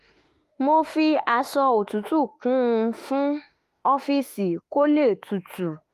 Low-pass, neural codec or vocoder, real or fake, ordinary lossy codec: 14.4 kHz; autoencoder, 48 kHz, 32 numbers a frame, DAC-VAE, trained on Japanese speech; fake; Opus, 32 kbps